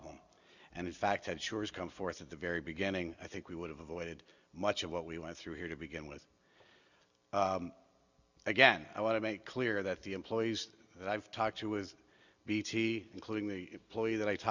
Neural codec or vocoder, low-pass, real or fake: none; 7.2 kHz; real